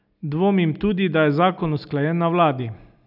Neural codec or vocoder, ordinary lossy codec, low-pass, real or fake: none; none; 5.4 kHz; real